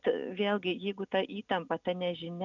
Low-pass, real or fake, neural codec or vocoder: 7.2 kHz; real; none